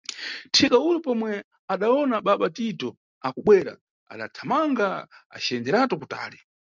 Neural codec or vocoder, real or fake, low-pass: none; real; 7.2 kHz